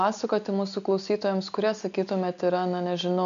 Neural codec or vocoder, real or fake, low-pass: none; real; 7.2 kHz